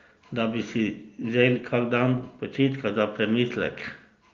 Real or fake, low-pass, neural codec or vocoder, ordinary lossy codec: real; 7.2 kHz; none; Opus, 32 kbps